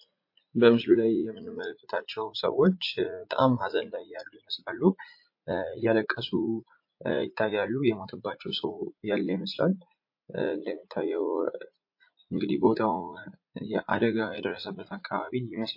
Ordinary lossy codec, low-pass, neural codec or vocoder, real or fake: MP3, 32 kbps; 5.4 kHz; vocoder, 44.1 kHz, 80 mel bands, Vocos; fake